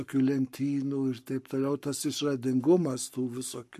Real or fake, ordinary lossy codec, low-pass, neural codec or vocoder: fake; MP3, 64 kbps; 14.4 kHz; codec, 44.1 kHz, 7.8 kbps, Pupu-Codec